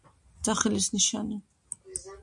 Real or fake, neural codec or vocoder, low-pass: real; none; 10.8 kHz